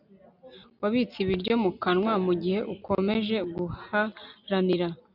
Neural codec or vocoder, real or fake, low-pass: none; real; 5.4 kHz